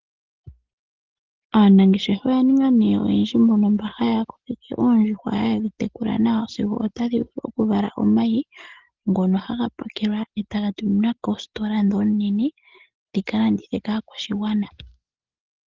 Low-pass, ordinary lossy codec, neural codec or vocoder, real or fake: 7.2 kHz; Opus, 16 kbps; none; real